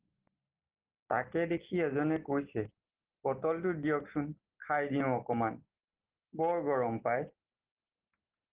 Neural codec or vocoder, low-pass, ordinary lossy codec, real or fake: none; 3.6 kHz; Opus, 16 kbps; real